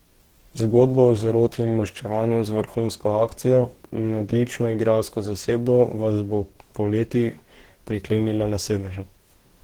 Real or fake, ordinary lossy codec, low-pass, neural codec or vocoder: fake; Opus, 16 kbps; 19.8 kHz; codec, 44.1 kHz, 2.6 kbps, DAC